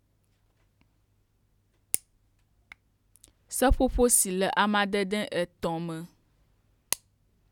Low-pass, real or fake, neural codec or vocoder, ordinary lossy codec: 19.8 kHz; real; none; none